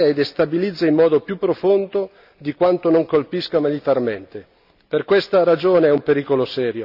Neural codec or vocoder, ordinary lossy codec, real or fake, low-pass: none; none; real; 5.4 kHz